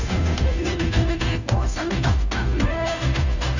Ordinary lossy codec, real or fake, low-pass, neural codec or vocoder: none; fake; 7.2 kHz; codec, 16 kHz, 0.5 kbps, FunCodec, trained on Chinese and English, 25 frames a second